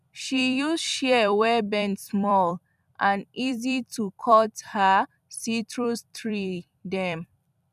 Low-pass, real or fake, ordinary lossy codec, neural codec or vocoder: 14.4 kHz; fake; none; vocoder, 44.1 kHz, 128 mel bands every 256 samples, BigVGAN v2